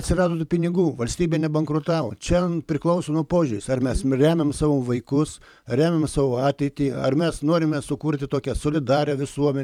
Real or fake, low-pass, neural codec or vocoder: fake; 19.8 kHz; vocoder, 44.1 kHz, 128 mel bands every 512 samples, BigVGAN v2